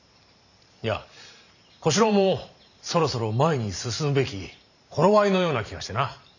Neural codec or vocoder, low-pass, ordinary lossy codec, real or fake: vocoder, 44.1 kHz, 128 mel bands every 512 samples, BigVGAN v2; 7.2 kHz; none; fake